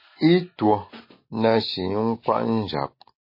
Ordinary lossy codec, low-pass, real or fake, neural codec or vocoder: MP3, 24 kbps; 5.4 kHz; real; none